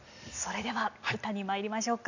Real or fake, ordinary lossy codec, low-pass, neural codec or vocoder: real; none; 7.2 kHz; none